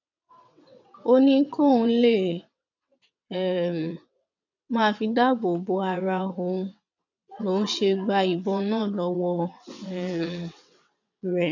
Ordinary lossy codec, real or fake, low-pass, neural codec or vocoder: none; fake; 7.2 kHz; vocoder, 22.05 kHz, 80 mel bands, WaveNeXt